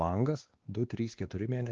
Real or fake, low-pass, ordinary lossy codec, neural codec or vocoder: fake; 7.2 kHz; Opus, 16 kbps; codec, 16 kHz, 2 kbps, X-Codec, WavLM features, trained on Multilingual LibriSpeech